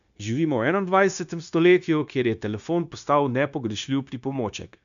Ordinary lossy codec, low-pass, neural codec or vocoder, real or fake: none; 7.2 kHz; codec, 16 kHz, 0.9 kbps, LongCat-Audio-Codec; fake